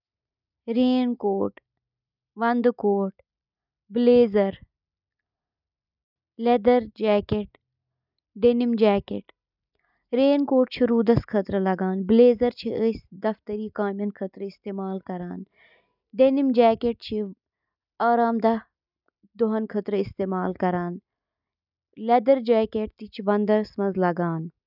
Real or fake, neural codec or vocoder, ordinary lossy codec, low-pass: real; none; none; 5.4 kHz